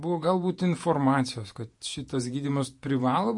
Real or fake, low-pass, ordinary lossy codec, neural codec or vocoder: fake; 10.8 kHz; MP3, 48 kbps; vocoder, 48 kHz, 128 mel bands, Vocos